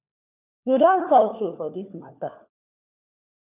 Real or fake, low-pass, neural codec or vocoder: fake; 3.6 kHz; codec, 16 kHz, 4 kbps, FunCodec, trained on LibriTTS, 50 frames a second